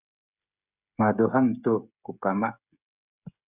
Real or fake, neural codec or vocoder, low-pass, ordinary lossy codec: fake; codec, 16 kHz, 16 kbps, FreqCodec, smaller model; 3.6 kHz; Opus, 64 kbps